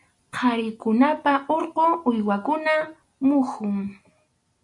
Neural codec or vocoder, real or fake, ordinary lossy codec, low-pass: none; real; AAC, 64 kbps; 10.8 kHz